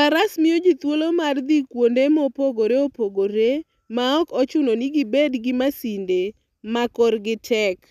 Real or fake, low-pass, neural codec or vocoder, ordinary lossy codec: real; 14.4 kHz; none; none